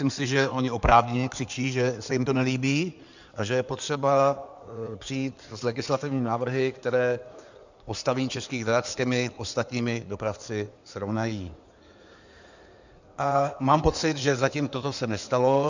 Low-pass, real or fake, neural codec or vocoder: 7.2 kHz; fake; codec, 16 kHz in and 24 kHz out, 2.2 kbps, FireRedTTS-2 codec